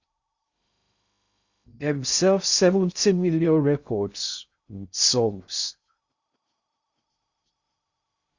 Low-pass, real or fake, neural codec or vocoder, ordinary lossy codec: 7.2 kHz; fake; codec, 16 kHz in and 24 kHz out, 0.6 kbps, FocalCodec, streaming, 2048 codes; Opus, 64 kbps